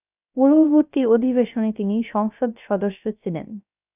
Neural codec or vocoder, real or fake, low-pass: codec, 16 kHz, 0.3 kbps, FocalCodec; fake; 3.6 kHz